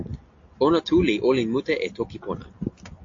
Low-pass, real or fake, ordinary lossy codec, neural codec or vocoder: 7.2 kHz; real; AAC, 48 kbps; none